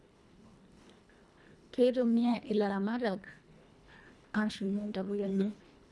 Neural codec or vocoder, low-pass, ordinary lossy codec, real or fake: codec, 24 kHz, 1.5 kbps, HILCodec; none; none; fake